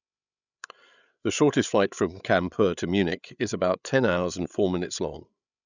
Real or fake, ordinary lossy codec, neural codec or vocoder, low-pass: fake; none; codec, 16 kHz, 8 kbps, FreqCodec, larger model; 7.2 kHz